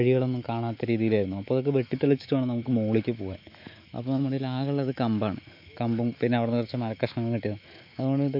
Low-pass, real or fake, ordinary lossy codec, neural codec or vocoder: 5.4 kHz; fake; MP3, 48 kbps; autoencoder, 48 kHz, 128 numbers a frame, DAC-VAE, trained on Japanese speech